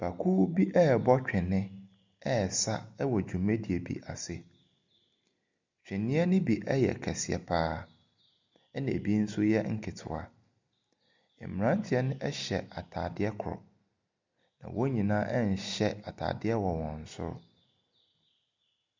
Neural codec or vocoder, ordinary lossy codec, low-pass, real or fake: none; MP3, 64 kbps; 7.2 kHz; real